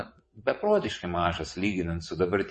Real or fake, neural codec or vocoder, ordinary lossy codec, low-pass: fake; vocoder, 22.05 kHz, 80 mel bands, WaveNeXt; MP3, 32 kbps; 9.9 kHz